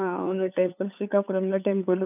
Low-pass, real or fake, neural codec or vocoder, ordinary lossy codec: 3.6 kHz; fake; codec, 16 kHz, 8 kbps, FunCodec, trained on LibriTTS, 25 frames a second; none